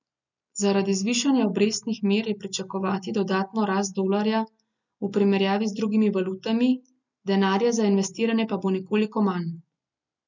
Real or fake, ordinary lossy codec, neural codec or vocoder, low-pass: real; none; none; 7.2 kHz